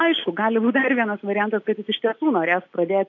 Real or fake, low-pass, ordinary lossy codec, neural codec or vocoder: real; 7.2 kHz; AAC, 48 kbps; none